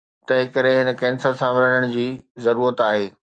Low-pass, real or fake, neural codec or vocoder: 9.9 kHz; fake; codec, 44.1 kHz, 7.8 kbps, DAC